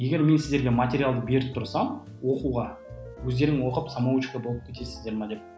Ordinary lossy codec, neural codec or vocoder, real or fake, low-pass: none; none; real; none